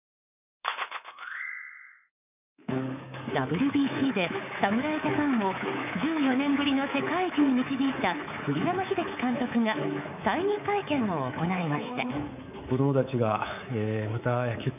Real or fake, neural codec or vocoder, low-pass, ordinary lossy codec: fake; codec, 24 kHz, 3.1 kbps, DualCodec; 3.6 kHz; none